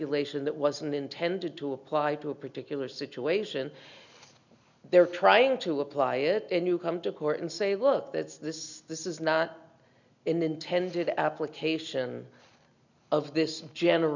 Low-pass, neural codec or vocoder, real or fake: 7.2 kHz; none; real